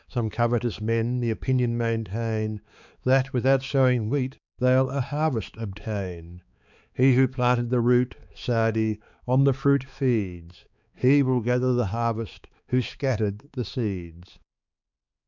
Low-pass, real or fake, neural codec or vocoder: 7.2 kHz; fake; codec, 16 kHz, 4 kbps, X-Codec, HuBERT features, trained on balanced general audio